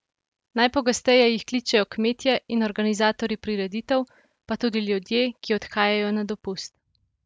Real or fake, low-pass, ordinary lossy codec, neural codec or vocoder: real; none; none; none